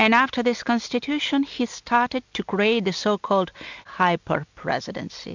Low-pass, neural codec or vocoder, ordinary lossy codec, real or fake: 7.2 kHz; none; MP3, 64 kbps; real